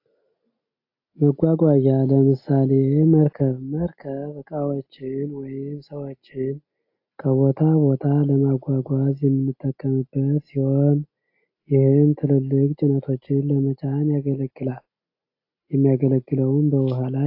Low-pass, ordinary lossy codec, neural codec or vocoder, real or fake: 5.4 kHz; AAC, 32 kbps; none; real